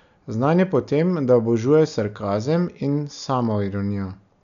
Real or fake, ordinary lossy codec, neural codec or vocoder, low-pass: real; none; none; 7.2 kHz